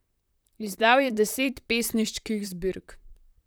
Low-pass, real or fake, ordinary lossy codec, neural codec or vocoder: none; fake; none; vocoder, 44.1 kHz, 128 mel bands, Pupu-Vocoder